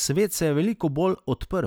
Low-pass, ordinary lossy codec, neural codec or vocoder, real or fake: none; none; none; real